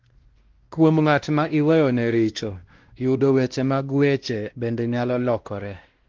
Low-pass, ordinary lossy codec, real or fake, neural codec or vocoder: 7.2 kHz; Opus, 16 kbps; fake; codec, 16 kHz, 1 kbps, X-Codec, WavLM features, trained on Multilingual LibriSpeech